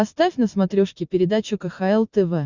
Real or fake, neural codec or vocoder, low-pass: real; none; 7.2 kHz